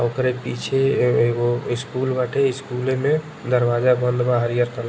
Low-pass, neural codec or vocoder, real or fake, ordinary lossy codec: none; none; real; none